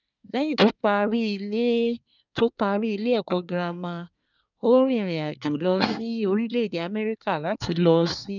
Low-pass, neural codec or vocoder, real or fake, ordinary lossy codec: 7.2 kHz; codec, 24 kHz, 1 kbps, SNAC; fake; none